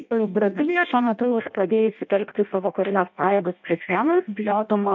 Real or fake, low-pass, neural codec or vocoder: fake; 7.2 kHz; codec, 16 kHz in and 24 kHz out, 0.6 kbps, FireRedTTS-2 codec